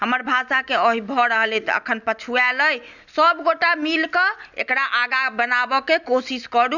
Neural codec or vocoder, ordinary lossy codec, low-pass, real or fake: none; none; 7.2 kHz; real